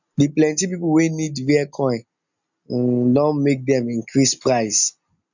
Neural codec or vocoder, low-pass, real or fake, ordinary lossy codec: none; 7.2 kHz; real; none